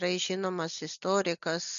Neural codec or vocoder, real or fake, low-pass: none; real; 7.2 kHz